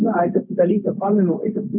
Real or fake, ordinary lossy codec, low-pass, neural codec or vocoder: fake; none; 3.6 kHz; codec, 16 kHz, 0.4 kbps, LongCat-Audio-Codec